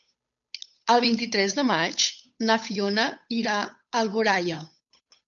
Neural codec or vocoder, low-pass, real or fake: codec, 16 kHz, 8 kbps, FunCodec, trained on Chinese and English, 25 frames a second; 7.2 kHz; fake